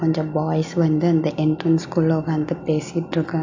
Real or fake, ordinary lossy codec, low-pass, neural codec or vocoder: real; AAC, 48 kbps; 7.2 kHz; none